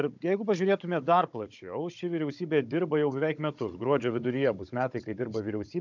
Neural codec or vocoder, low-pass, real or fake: codec, 16 kHz, 16 kbps, FunCodec, trained on Chinese and English, 50 frames a second; 7.2 kHz; fake